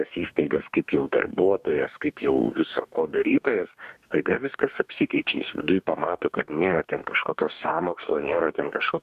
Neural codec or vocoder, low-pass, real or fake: codec, 44.1 kHz, 2.6 kbps, DAC; 14.4 kHz; fake